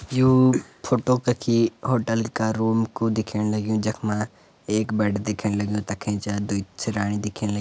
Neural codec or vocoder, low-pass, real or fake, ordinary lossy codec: none; none; real; none